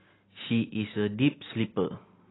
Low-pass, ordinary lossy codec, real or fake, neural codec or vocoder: 7.2 kHz; AAC, 16 kbps; real; none